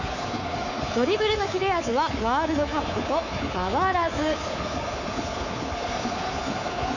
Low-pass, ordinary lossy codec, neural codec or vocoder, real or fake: 7.2 kHz; none; codec, 24 kHz, 3.1 kbps, DualCodec; fake